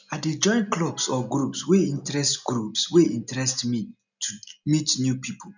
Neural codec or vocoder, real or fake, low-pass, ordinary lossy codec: none; real; 7.2 kHz; none